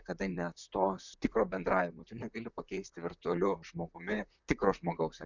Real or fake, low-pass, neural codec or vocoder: fake; 7.2 kHz; vocoder, 44.1 kHz, 128 mel bands, Pupu-Vocoder